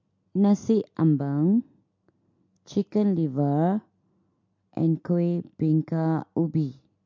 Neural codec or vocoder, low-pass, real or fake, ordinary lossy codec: none; 7.2 kHz; real; MP3, 48 kbps